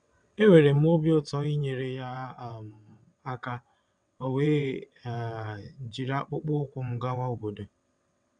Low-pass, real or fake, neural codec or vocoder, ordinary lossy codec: 9.9 kHz; fake; vocoder, 22.05 kHz, 80 mel bands, WaveNeXt; none